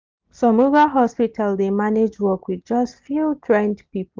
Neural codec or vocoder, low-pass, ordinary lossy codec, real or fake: none; 7.2 kHz; Opus, 32 kbps; real